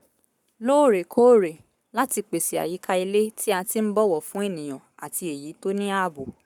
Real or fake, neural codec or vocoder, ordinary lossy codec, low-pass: fake; codec, 44.1 kHz, 7.8 kbps, Pupu-Codec; none; 19.8 kHz